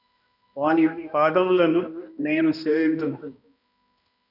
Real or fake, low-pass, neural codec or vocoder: fake; 5.4 kHz; codec, 16 kHz, 1 kbps, X-Codec, HuBERT features, trained on balanced general audio